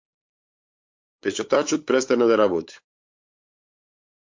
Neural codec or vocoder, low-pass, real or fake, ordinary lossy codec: codec, 16 kHz, 8 kbps, FunCodec, trained on LibriTTS, 25 frames a second; 7.2 kHz; fake; MP3, 48 kbps